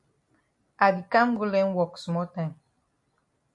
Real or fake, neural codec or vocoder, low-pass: real; none; 10.8 kHz